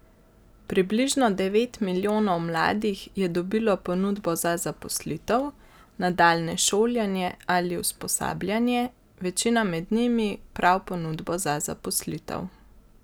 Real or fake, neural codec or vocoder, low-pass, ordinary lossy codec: real; none; none; none